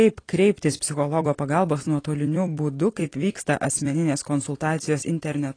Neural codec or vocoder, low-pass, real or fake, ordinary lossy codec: vocoder, 44.1 kHz, 128 mel bands every 256 samples, BigVGAN v2; 9.9 kHz; fake; AAC, 32 kbps